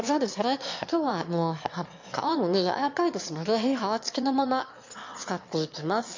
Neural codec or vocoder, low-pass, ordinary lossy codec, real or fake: autoencoder, 22.05 kHz, a latent of 192 numbers a frame, VITS, trained on one speaker; 7.2 kHz; MP3, 48 kbps; fake